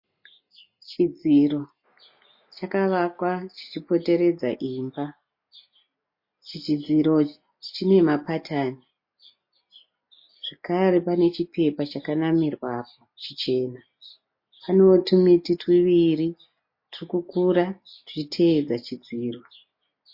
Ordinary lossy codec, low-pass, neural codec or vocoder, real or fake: MP3, 32 kbps; 5.4 kHz; none; real